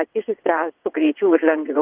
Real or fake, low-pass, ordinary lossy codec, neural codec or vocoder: fake; 3.6 kHz; Opus, 24 kbps; vocoder, 22.05 kHz, 80 mel bands, WaveNeXt